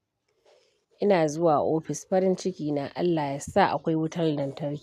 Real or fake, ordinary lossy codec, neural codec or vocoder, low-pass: real; none; none; 14.4 kHz